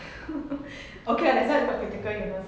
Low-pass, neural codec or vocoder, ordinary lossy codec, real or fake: none; none; none; real